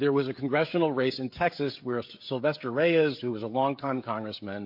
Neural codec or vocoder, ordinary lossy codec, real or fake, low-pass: codec, 16 kHz, 16 kbps, FreqCodec, smaller model; MP3, 32 kbps; fake; 5.4 kHz